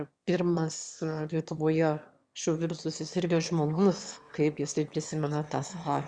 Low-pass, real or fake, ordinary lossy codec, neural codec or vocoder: 9.9 kHz; fake; Opus, 64 kbps; autoencoder, 22.05 kHz, a latent of 192 numbers a frame, VITS, trained on one speaker